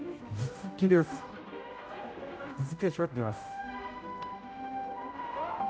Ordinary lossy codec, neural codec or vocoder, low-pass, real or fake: none; codec, 16 kHz, 0.5 kbps, X-Codec, HuBERT features, trained on general audio; none; fake